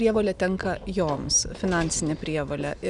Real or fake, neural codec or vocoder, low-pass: real; none; 10.8 kHz